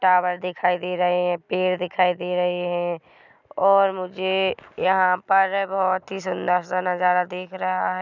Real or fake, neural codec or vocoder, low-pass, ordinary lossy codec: real; none; 7.2 kHz; none